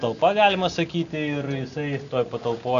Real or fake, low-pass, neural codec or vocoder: real; 7.2 kHz; none